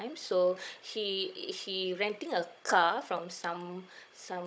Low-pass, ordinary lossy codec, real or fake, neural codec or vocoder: none; none; fake; codec, 16 kHz, 16 kbps, FunCodec, trained on Chinese and English, 50 frames a second